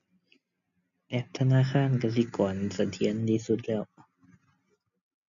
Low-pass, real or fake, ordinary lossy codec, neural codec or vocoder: 7.2 kHz; real; none; none